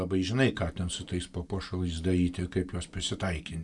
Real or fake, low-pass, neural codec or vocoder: real; 10.8 kHz; none